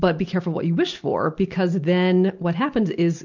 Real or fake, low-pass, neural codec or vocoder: real; 7.2 kHz; none